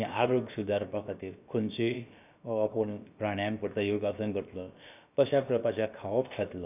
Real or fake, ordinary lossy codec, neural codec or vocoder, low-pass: fake; none; codec, 16 kHz, about 1 kbps, DyCAST, with the encoder's durations; 3.6 kHz